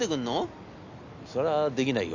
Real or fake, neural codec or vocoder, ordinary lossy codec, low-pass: real; none; AAC, 48 kbps; 7.2 kHz